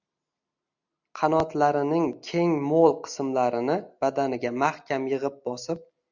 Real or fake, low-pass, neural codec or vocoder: real; 7.2 kHz; none